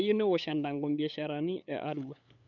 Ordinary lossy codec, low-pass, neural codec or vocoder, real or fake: none; 7.2 kHz; codec, 16 kHz, 8 kbps, FunCodec, trained on LibriTTS, 25 frames a second; fake